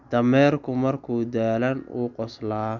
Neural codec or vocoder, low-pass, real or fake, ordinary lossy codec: none; 7.2 kHz; real; none